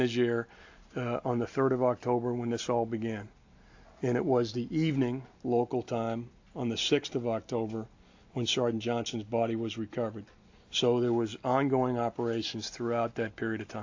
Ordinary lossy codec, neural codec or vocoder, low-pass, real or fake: AAC, 48 kbps; none; 7.2 kHz; real